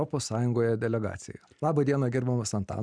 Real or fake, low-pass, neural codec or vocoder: real; 9.9 kHz; none